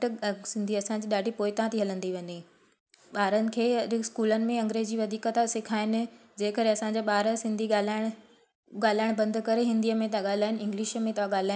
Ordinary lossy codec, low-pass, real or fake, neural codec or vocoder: none; none; real; none